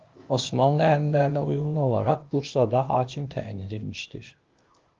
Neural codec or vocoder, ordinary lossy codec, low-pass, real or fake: codec, 16 kHz, 0.8 kbps, ZipCodec; Opus, 32 kbps; 7.2 kHz; fake